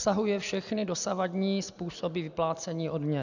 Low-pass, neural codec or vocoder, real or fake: 7.2 kHz; none; real